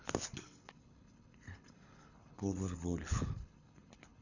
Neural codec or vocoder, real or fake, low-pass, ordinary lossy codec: codec, 24 kHz, 6 kbps, HILCodec; fake; 7.2 kHz; none